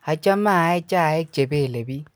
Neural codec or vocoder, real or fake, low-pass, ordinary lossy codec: none; real; none; none